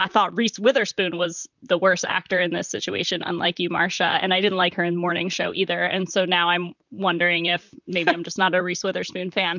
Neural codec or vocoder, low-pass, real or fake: vocoder, 44.1 kHz, 128 mel bands, Pupu-Vocoder; 7.2 kHz; fake